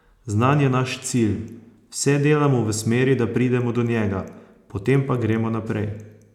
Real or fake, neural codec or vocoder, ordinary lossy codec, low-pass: real; none; none; 19.8 kHz